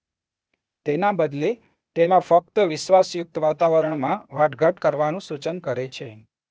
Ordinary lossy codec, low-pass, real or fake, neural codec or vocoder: none; none; fake; codec, 16 kHz, 0.8 kbps, ZipCodec